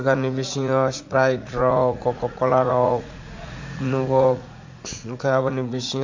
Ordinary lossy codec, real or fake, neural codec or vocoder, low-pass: MP3, 48 kbps; fake; vocoder, 44.1 kHz, 80 mel bands, Vocos; 7.2 kHz